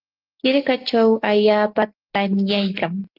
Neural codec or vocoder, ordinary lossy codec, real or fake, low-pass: none; Opus, 32 kbps; real; 5.4 kHz